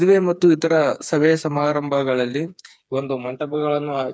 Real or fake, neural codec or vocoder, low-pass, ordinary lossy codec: fake; codec, 16 kHz, 4 kbps, FreqCodec, smaller model; none; none